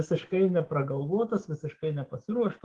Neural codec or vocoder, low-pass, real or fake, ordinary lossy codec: codec, 24 kHz, 3.1 kbps, DualCodec; 10.8 kHz; fake; Opus, 16 kbps